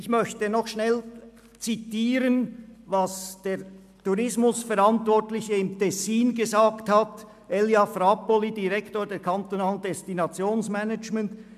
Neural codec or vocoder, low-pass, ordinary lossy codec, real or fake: none; 14.4 kHz; MP3, 96 kbps; real